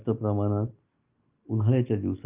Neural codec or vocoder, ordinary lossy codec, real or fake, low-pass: none; Opus, 24 kbps; real; 3.6 kHz